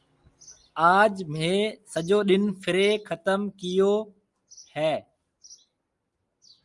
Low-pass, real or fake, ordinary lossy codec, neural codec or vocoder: 10.8 kHz; real; Opus, 32 kbps; none